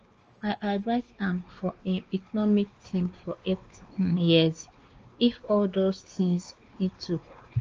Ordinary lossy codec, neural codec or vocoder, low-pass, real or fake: Opus, 24 kbps; codec, 16 kHz, 2 kbps, X-Codec, WavLM features, trained on Multilingual LibriSpeech; 7.2 kHz; fake